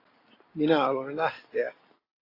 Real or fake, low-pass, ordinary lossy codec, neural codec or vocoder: real; 5.4 kHz; AAC, 24 kbps; none